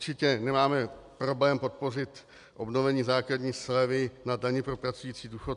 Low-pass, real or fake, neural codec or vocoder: 10.8 kHz; real; none